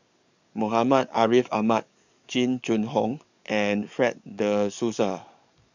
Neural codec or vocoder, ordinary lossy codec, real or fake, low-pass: codec, 44.1 kHz, 7.8 kbps, DAC; none; fake; 7.2 kHz